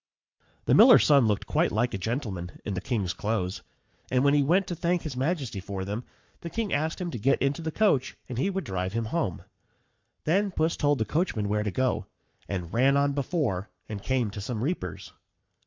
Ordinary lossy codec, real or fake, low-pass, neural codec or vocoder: MP3, 64 kbps; fake; 7.2 kHz; codec, 44.1 kHz, 7.8 kbps, Pupu-Codec